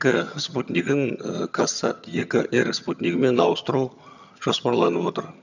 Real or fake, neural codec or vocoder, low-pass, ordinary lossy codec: fake; vocoder, 22.05 kHz, 80 mel bands, HiFi-GAN; 7.2 kHz; none